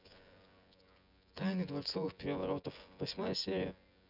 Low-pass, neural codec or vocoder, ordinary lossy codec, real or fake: 5.4 kHz; vocoder, 24 kHz, 100 mel bands, Vocos; none; fake